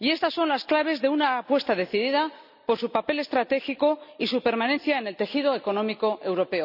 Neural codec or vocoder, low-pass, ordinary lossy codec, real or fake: none; 5.4 kHz; none; real